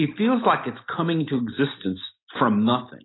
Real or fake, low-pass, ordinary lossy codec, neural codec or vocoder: real; 7.2 kHz; AAC, 16 kbps; none